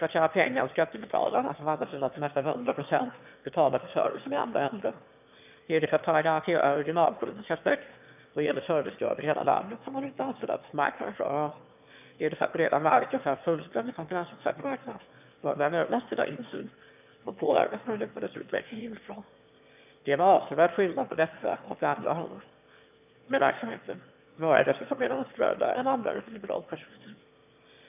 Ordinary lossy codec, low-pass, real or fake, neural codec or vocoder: none; 3.6 kHz; fake; autoencoder, 22.05 kHz, a latent of 192 numbers a frame, VITS, trained on one speaker